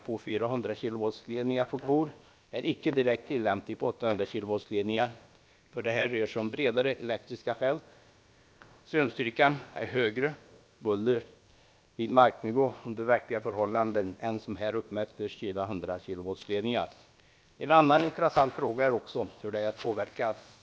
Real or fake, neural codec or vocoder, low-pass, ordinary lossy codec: fake; codec, 16 kHz, about 1 kbps, DyCAST, with the encoder's durations; none; none